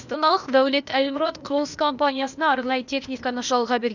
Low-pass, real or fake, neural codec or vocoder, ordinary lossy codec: 7.2 kHz; fake; codec, 16 kHz, 0.8 kbps, ZipCodec; none